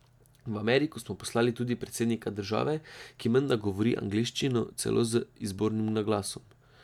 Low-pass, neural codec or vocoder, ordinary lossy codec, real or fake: 19.8 kHz; none; none; real